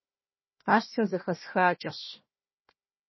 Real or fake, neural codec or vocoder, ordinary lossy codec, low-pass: fake; codec, 16 kHz, 1 kbps, FunCodec, trained on Chinese and English, 50 frames a second; MP3, 24 kbps; 7.2 kHz